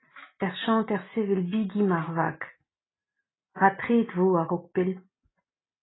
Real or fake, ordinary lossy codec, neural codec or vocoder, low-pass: real; AAC, 16 kbps; none; 7.2 kHz